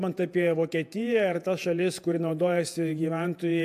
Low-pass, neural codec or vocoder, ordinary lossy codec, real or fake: 14.4 kHz; vocoder, 48 kHz, 128 mel bands, Vocos; MP3, 96 kbps; fake